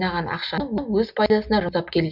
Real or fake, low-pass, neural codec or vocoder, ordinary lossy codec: real; 5.4 kHz; none; none